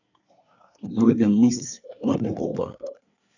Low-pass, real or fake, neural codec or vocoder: 7.2 kHz; fake; codec, 24 kHz, 1 kbps, SNAC